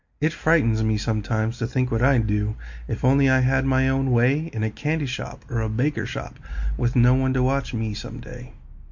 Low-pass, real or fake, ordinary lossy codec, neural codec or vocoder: 7.2 kHz; real; MP3, 48 kbps; none